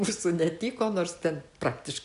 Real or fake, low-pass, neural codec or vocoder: real; 10.8 kHz; none